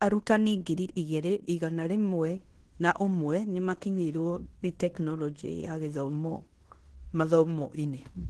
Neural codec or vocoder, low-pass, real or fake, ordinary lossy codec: codec, 16 kHz in and 24 kHz out, 0.9 kbps, LongCat-Audio-Codec, fine tuned four codebook decoder; 10.8 kHz; fake; Opus, 16 kbps